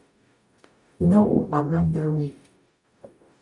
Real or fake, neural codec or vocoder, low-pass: fake; codec, 44.1 kHz, 0.9 kbps, DAC; 10.8 kHz